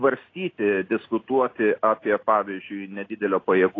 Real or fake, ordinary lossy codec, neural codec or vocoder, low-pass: real; AAC, 32 kbps; none; 7.2 kHz